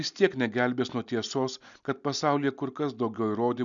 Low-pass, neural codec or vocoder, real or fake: 7.2 kHz; none; real